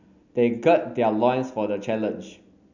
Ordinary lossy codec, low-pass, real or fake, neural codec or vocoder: none; 7.2 kHz; real; none